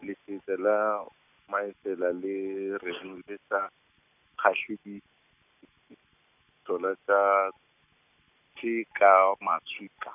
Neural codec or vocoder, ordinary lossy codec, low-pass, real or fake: none; none; 3.6 kHz; real